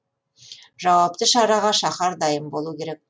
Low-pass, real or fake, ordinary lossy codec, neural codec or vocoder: none; real; none; none